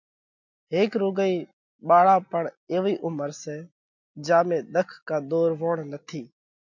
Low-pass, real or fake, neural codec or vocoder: 7.2 kHz; real; none